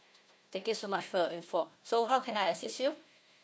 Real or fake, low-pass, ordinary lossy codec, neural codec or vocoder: fake; none; none; codec, 16 kHz, 1 kbps, FunCodec, trained on Chinese and English, 50 frames a second